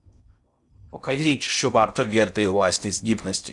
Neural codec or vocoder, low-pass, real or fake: codec, 16 kHz in and 24 kHz out, 0.6 kbps, FocalCodec, streaming, 4096 codes; 10.8 kHz; fake